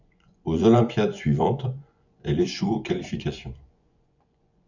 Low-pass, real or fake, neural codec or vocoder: 7.2 kHz; fake; vocoder, 24 kHz, 100 mel bands, Vocos